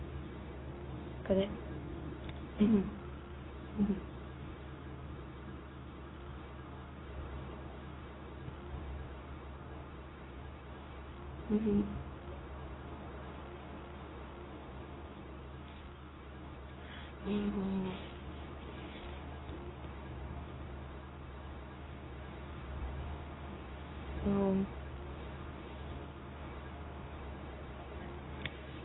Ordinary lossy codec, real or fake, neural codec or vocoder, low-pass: AAC, 16 kbps; fake; codec, 24 kHz, 0.9 kbps, WavTokenizer, medium speech release version 2; 7.2 kHz